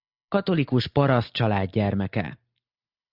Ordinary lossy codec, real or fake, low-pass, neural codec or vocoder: Opus, 64 kbps; real; 5.4 kHz; none